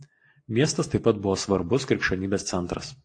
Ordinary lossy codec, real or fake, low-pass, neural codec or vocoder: AAC, 48 kbps; real; 9.9 kHz; none